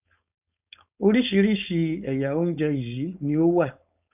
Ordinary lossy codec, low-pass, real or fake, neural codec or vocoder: none; 3.6 kHz; fake; codec, 16 kHz, 4.8 kbps, FACodec